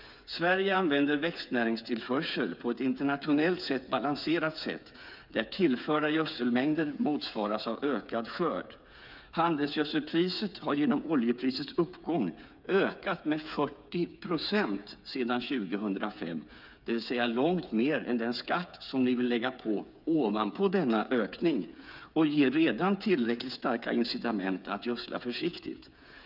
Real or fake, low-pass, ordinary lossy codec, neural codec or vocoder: fake; 5.4 kHz; none; codec, 16 kHz, 8 kbps, FreqCodec, smaller model